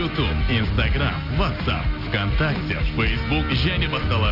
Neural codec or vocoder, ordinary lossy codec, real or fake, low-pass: none; none; real; 5.4 kHz